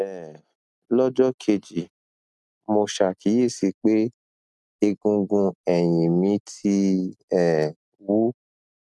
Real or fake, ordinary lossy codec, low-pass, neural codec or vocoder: real; none; none; none